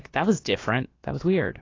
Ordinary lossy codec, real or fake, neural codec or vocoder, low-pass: AAC, 32 kbps; fake; codec, 16 kHz, about 1 kbps, DyCAST, with the encoder's durations; 7.2 kHz